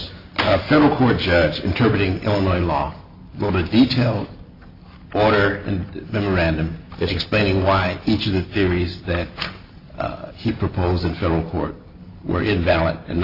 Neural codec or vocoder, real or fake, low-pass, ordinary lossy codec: none; real; 5.4 kHz; AAC, 32 kbps